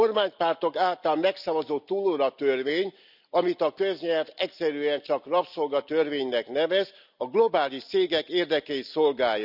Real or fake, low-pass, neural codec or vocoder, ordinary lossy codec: real; 5.4 kHz; none; none